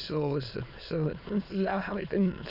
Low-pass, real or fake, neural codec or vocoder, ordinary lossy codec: 5.4 kHz; fake; autoencoder, 22.05 kHz, a latent of 192 numbers a frame, VITS, trained on many speakers; none